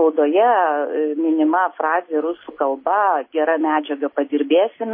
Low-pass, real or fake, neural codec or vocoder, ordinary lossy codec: 5.4 kHz; real; none; MP3, 24 kbps